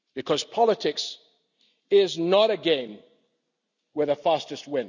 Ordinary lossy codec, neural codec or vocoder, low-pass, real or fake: none; none; 7.2 kHz; real